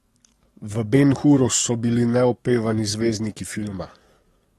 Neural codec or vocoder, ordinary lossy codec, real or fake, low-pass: codec, 44.1 kHz, 7.8 kbps, DAC; AAC, 32 kbps; fake; 19.8 kHz